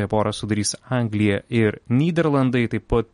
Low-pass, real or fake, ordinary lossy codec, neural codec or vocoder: 19.8 kHz; real; MP3, 48 kbps; none